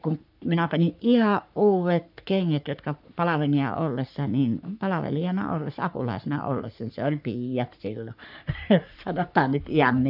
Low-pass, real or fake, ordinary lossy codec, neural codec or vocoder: 5.4 kHz; fake; none; codec, 44.1 kHz, 3.4 kbps, Pupu-Codec